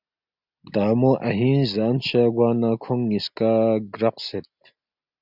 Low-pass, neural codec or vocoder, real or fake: 5.4 kHz; none; real